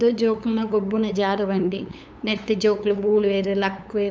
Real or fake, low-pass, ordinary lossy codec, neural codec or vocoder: fake; none; none; codec, 16 kHz, 8 kbps, FunCodec, trained on LibriTTS, 25 frames a second